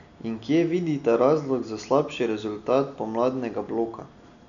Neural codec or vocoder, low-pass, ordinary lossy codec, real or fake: none; 7.2 kHz; none; real